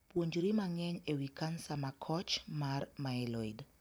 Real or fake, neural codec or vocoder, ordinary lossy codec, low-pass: fake; vocoder, 44.1 kHz, 128 mel bands every 256 samples, BigVGAN v2; none; none